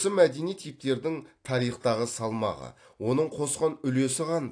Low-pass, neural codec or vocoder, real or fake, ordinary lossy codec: 9.9 kHz; none; real; AAC, 48 kbps